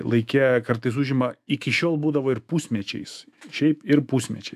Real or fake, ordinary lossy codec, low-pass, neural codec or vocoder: fake; AAC, 96 kbps; 14.4 kHz; autoencoder, 48 kHz, 128 numbers a frame, DAC-VAE, trained on Japanese speech